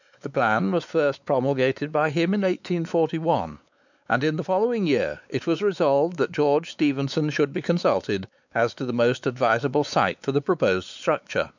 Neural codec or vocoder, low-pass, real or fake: codec, 16 kHz, 4 kbps, X-Codec, WavLM features, trained on Multilingual LibriSpeech; 7.2 kHz; fake